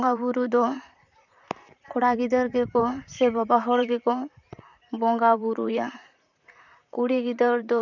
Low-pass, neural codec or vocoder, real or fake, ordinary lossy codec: 7.2 kHz; vocoder, 44.1 kHz, 128 mel bands, Pupu-Vocoder; fake; none